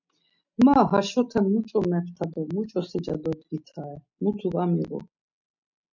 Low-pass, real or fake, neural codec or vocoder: 7.2 kHz; real; none